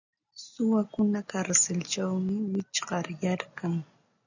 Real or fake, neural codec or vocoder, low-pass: real; none; 7.2 kHz